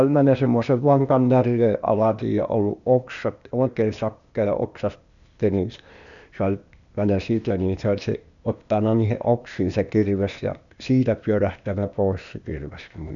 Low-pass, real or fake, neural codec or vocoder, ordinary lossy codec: 7.2 kHz; fake; codec, 16 kHz, 0.8 kbps, ZipCodec; none